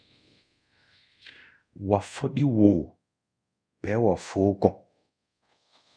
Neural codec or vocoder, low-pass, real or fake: codec, 24 kHz, 0.5 kbps, DualCodec; 9.9 kHz; fake